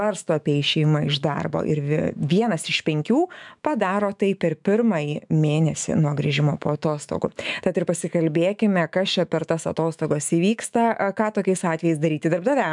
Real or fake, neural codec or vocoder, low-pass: fake; autoencoder, 48 kHz, 128 numbers a frame, DAC-VAE, trained on Japanese speech; 10.8 kHz